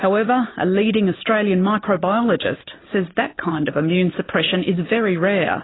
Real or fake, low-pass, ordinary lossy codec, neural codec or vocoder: real; 7.2 kHz; AAC, 16 kbps; none